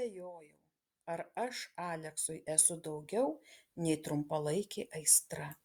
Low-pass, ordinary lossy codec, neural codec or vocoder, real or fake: 19.8 kHz; Opus, 64 kbps; none; real